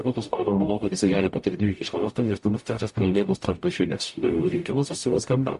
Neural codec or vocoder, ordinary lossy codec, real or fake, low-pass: codec, 44.1 kHz, 0.9 kbps, DAC; MP3, 48 kbps; fake; 14.4 kHz